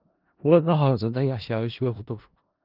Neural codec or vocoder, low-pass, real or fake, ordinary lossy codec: codec, 16 kHz in and 24 kHz out, 0.4 kbps, LongCat-Audio-Codec, four codebook decoder; 5.4 kHz; fake; Opus, 32 kbps